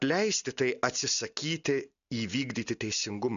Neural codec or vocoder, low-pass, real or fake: none; 7.2 kHz; real